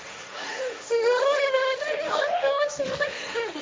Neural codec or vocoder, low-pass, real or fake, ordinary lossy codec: codec, 16 kHz, 1.1 kbps, Voila-Tokenizer; none; fake; none